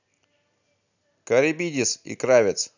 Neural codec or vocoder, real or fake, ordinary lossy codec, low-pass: none; real; none; 7.2 kHz